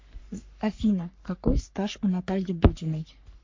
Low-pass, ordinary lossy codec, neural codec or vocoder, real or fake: 7.2 kHz; MP3, 48 kbps; codec, 44.1 kHz, 3.4 kbps, Pupu-Codec; fake